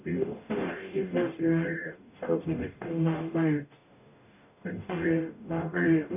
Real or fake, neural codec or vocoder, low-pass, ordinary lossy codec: fake; codec, 44.1 kHz, 0.9 kbps, DAC; 3.6 kHz; none